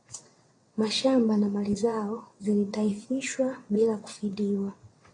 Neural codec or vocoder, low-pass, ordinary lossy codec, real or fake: vocoder, 22.05 kHz, 80 mel bands, WaveNeXt; 9.9 kHz; AAC, 32 kbps; fake